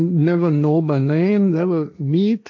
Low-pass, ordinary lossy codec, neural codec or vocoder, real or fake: 7.2 kHz; MP3, 48 kbps; codec, 16 kHz, 1.1 kbps, Voila-Tokenizer; fake